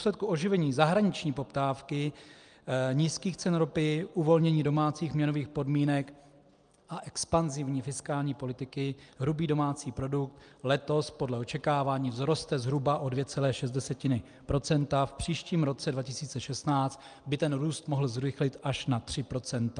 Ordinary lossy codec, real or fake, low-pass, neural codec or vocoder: Opus, 32 kbps; real; 9.9 kHz; none